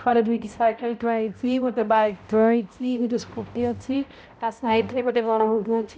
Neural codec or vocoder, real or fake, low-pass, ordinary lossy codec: codec, 16 kHz, 0.5 kbps, X-Codec, HuBERT features, trained on balanced general audio; fake; none; none